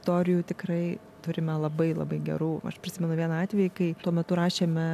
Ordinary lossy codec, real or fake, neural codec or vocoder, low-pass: MP3, 96 kbps; real; none; 14.4 kHz